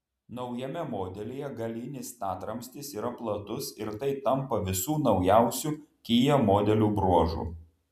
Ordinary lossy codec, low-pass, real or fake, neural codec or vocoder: AAC, 96 kbps; 14.4 kHz; real; none